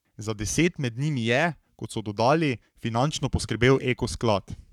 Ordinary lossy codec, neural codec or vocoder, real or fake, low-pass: none; codec, 44.1 kHz, 7.8 kbps, Pupu-Codec; fake; 19.8 kHz